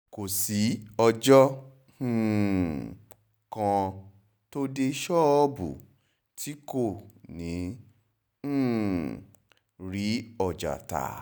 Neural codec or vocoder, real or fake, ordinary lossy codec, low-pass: none; real; none; none